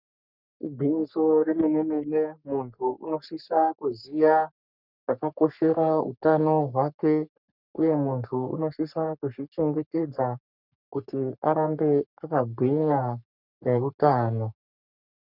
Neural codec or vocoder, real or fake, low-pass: codec, 44.1 kHz, 3.4 kbps, Pupu-Codec; fake; 5.4 kHz